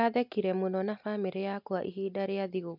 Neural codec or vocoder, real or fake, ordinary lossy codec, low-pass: none; real; MP3, 48 kbps; 5.4 kHz